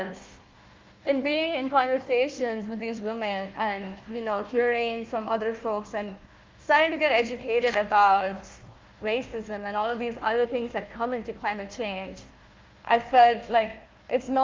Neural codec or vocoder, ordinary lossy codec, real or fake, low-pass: codec, 16 kHz, 1 kbps, FunCodec, trained on Chinese and English, 50 frames a second; Opus, 32 kbps; fake; 7.2 kHz